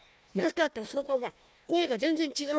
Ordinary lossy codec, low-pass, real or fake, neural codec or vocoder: none; none; fake; codec, 16 kHz, 1 kbps, FunCodec, trained on Chinese and English, 50 frames a second